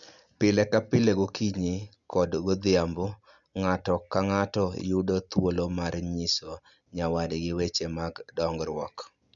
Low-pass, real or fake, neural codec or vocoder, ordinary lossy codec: 7.2 kHz; real; none; none